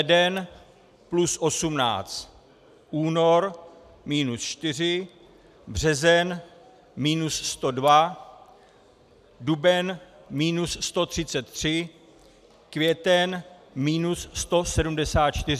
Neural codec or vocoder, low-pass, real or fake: none; 14.4 kHz; real